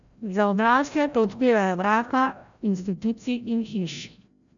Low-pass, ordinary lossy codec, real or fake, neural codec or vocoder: 7.2 kHz; none; fake; codec, 16 kHz, 0.5 kbps, FreqCodec, larger model